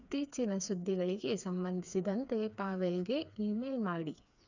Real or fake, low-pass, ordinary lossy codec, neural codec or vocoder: fake; 7.2 kHz; none; codec, 16 kHz, 4 kbps, FreqCodec, smaller model